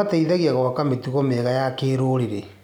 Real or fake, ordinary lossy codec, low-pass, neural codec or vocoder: real; none; 19.8 kHz; none